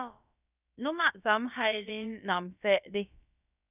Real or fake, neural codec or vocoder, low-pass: fake; codec, 16 kHz, about 1 kbps, DyCAST, with the encoder's durations; 3.6 kHz